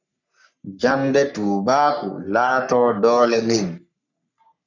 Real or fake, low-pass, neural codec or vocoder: fake; 7.2 kHz; codec, 44.1 kHz, 3.4 kbps, Pupu-Codec